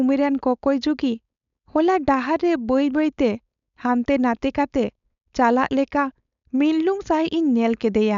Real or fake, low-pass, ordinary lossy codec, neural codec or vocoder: fake; 7.2 kHz; none; codec, 16 kHz, 4.8 kbps, FACodec